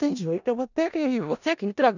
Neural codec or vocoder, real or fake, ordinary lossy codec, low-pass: codec, 16 kHz in and 24 kHz out, 0.4 kbps, LongCat-Audio-Codec, four codebook decoder; fake; none; 7.2 kHz